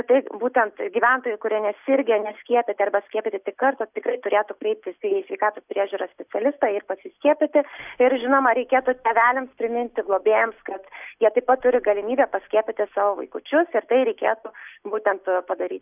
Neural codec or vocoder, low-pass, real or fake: none; 3.6 kHz; real